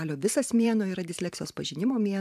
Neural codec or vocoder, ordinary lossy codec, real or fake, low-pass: vocoder, 44.1 kHz, 128 mel bands every 512 samples, BigVGAN v2; MP3, 96 kbps; fake; 14.4 kHz